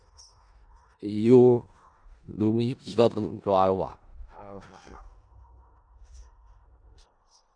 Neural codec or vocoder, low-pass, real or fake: codec, 16 kHz in and 24 kHz out, 0.4 kbps, LongCat-Audio-Codec, four codebook decoder; 9.9 kHz; fake